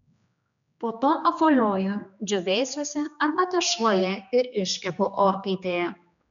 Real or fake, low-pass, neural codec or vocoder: fake; 7.2 kHz; codec, 16 kHz, 2 kbps, X-Codec, HuBERT features, trained on general audio